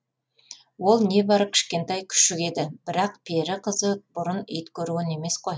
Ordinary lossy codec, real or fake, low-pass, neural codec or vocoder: none; real; none; none